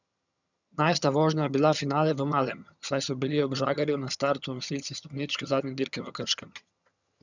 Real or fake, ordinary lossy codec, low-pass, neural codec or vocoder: fake; none; 7.2 kHz; vocoder, 22.05 kHz, 80 mel bands, HiFi-GAN